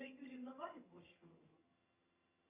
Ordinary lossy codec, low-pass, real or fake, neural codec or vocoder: Opus, 64 kbps; 3.6 kHz; fake; vocoder, 22.05 kHz, 80 mel bands, HiFi-GAN